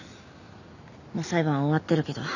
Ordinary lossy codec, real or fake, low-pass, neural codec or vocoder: none; real; 7.2 kHz; none